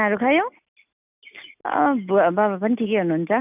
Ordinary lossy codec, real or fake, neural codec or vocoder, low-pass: none; real; none; 3.6 kHz